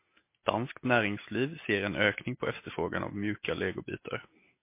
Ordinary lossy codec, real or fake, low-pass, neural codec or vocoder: MP3, 24 kbps; real; 3.6 kHz; none